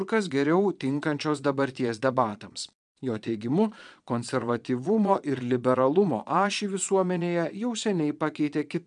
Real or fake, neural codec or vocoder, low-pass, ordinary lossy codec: fake; vocoder, 22.05 kHz, 80 mel bands, Vocos; 9.9 kHz; MP3, 96 kbps